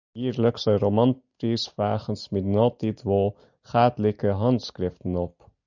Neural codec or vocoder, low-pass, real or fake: none; 7.2 kHz; real